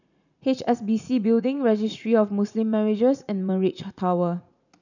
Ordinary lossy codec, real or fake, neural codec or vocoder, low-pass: none; fake; vocoder, 44.1 kHz, 80 mel bands, Vocos; 7.2 kHz